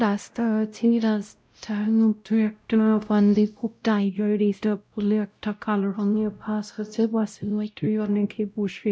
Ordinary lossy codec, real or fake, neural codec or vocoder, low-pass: none; fake; codec, 16 kHz, 0.5 kbps, X-Codec, WavLM features, trained on Multilingual LibriSpeech; none